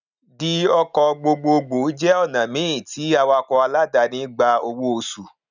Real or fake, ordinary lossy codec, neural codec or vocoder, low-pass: real; none; none; 7.2 kHz